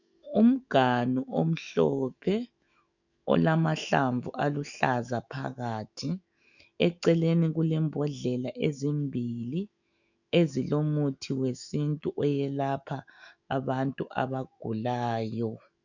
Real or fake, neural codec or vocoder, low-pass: fake; autoencoder, 48 kHz, 128 numbers a frame, DAC-VAE, trained on Japanese speech; 7.2 kHz